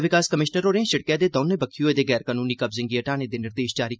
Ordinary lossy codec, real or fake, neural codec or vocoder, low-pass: none; real; none; none